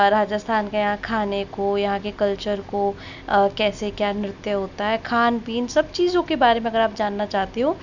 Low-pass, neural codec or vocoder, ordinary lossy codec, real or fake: 7.2 kHz; none; none; real